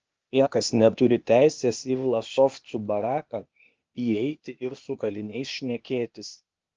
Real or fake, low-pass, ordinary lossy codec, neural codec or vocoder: fake; 7.2 kHz; Opus, 24 kbps; codec, 16 kHz, 0.8 kbps, ZipCodec